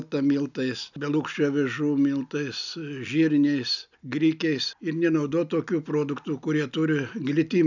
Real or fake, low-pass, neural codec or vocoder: real; 7.2 kHz; none